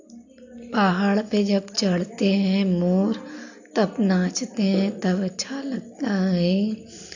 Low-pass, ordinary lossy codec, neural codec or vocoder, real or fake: 7.2 kHz; AAC, 48 kbps; none; real